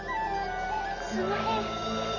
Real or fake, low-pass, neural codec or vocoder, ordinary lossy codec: real; 7.2 kHz; none; none